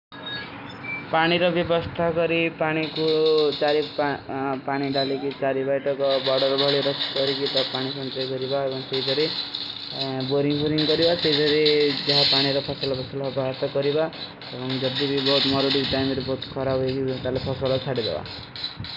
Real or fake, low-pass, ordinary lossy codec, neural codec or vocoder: real; 5.4 kHz; none; none